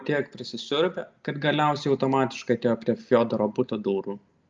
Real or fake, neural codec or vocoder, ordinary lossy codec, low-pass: real; none; Opus, 24 kbps; 7.2 kHz